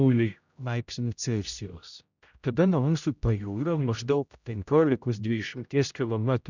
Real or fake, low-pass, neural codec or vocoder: fake; 7.2 kHz; codec, 16 kHz, 0.5 kbps, X-Codec, HuBERT features, trained on general audio